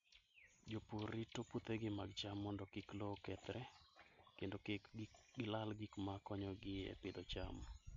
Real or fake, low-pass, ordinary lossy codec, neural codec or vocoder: real; 7.2 kHz; MP3, 48 kbps; none